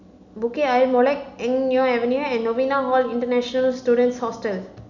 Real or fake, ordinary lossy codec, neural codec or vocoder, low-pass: real; none; none; 7.2 kHz